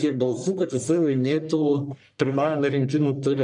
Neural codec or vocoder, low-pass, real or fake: codec, 44.1 kHz, 1.7 kbps, Pupu-Codec; 10.8 kHz; fake